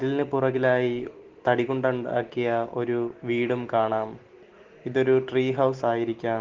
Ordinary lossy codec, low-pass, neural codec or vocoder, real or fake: Opus, 16 kbps; 7.2 kHz; none; real